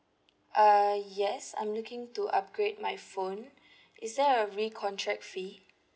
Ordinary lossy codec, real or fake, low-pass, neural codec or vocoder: none; real; none; none